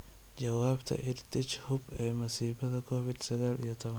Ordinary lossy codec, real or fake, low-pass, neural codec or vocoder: none; fake; none; vocoder, 44.1 kHz, 128 mel bands every 512 samples, BigVGAN v2